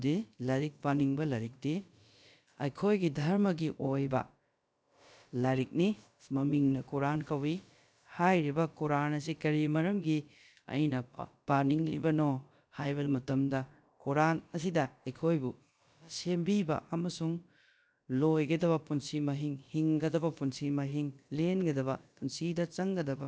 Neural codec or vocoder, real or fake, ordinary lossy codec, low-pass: codec, 16 kHz, about 1 kbps, DyCAST, with the encoder's durations; fake; none; none